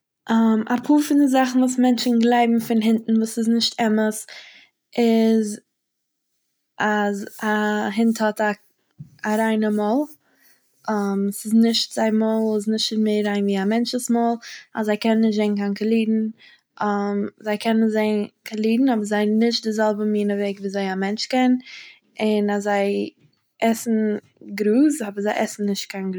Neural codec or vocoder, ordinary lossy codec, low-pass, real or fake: none; none; none; real